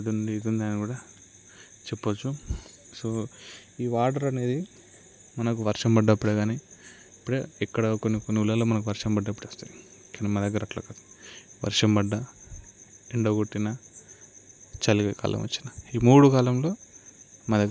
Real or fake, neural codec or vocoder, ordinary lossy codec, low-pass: real; none; none; none